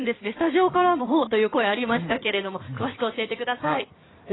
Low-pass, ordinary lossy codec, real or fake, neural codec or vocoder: 7.2 kHz; AAC, 16 kbps; fake; codec, 16 kHz in and 24 kHz out, 2.2 kbps, FireRedTTS-2 codec